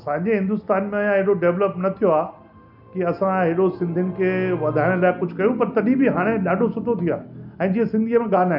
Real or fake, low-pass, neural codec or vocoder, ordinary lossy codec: real; 5.4 kHz; none; none